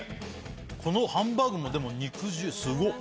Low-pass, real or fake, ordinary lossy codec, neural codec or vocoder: none; real; none; none